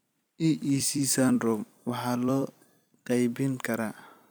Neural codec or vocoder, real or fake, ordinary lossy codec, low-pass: vocoder, 44.1 kHz, 128 mel bands every 256 samples, BigVGAN v2; fake; none; none